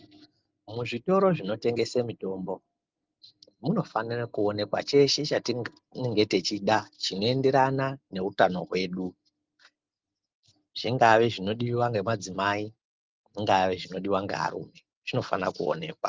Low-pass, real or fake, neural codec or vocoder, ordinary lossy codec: 7.2 kHz; real; none; Opus, 32 kbps